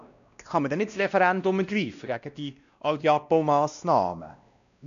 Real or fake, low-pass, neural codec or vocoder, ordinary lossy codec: fake; 7.2 kHz; codec, 16 kHz, 1 kbps, X-Codec, WavLM features, trained on Multilingual LibriSpeech; none